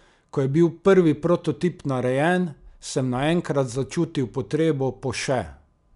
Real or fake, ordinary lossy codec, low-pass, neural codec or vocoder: real; none; 10.8 kHz; none